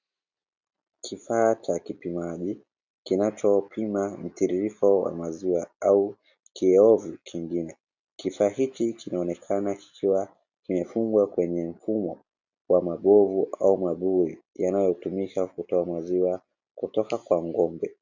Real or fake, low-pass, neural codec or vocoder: real; 7.2 kHz; none